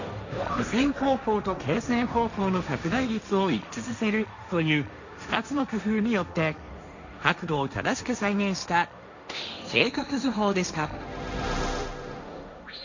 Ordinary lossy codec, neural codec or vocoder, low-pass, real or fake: none; codec, 16 kHz, 1.1 kbps, Voila-Tokenizer; 7.2 kHz; fake